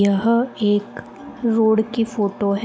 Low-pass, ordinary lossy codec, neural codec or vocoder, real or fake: none; none; none; real